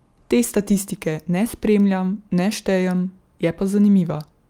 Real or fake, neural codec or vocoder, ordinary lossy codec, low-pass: real; none; Opus, 32 kbps; 19.8 kHz